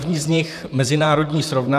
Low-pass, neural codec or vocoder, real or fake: 14.4 kHz; vocoder, 44.1 kHz, 128 mel bands, Pupu-Vocoder; fake